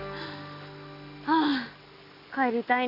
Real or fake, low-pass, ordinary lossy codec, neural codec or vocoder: real; 5.4 kHz; Opus, 64 kbps; none